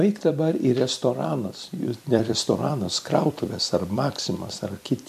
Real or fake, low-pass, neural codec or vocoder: fake; 14.4 kHz; vocoder, 44.1 kHz, 128 mel bands every 256 samples, BigVGAN v2